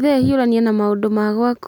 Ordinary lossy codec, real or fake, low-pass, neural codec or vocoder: none; real; 19.8 kHz; none